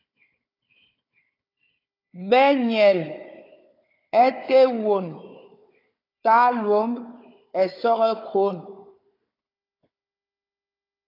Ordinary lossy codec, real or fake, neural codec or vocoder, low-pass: AAC, 32 kbps; fake; codec, 16 kHz, 4 kbps, FunCodec, trained on Chinese and English, 50 frames a second; 5.4 kHz